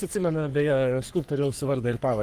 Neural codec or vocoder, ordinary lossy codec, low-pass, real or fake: codec, 44.1 kHz, 3.4 kbps, Pupu-Codec; Opus, 24 kbps; 14.4 kHz; fake